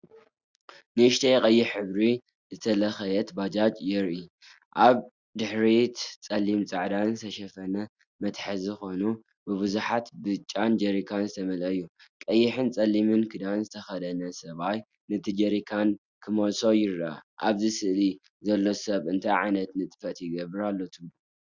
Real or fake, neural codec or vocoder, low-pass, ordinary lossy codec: real; none; 7.2 kHz; Opus, 64 kbps